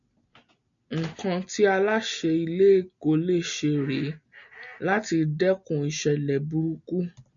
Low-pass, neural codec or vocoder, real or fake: 7.2 kHz; none; real